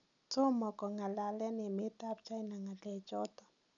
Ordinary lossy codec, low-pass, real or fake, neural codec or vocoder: none; 7.2 kHz; real; none